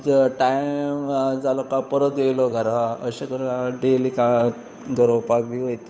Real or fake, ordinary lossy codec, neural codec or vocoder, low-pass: fake; none; codec, 16 kHz, 8 kbps, FunCodec, trained on Chinese and English, 25 frames a second; none